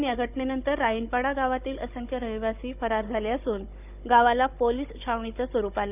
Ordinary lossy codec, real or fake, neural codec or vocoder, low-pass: none; fake; codec, 16 kHz, 16 kbps, FunCodec, trained on Chinese and English, 50 frames a second; 3.6 kHz